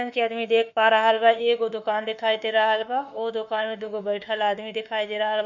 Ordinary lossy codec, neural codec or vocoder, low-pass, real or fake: none; autoencoder, 48 kHz, 32 numbers a frame, DAC-VAE, trained on Japanese speech; 7.2 kHz; fake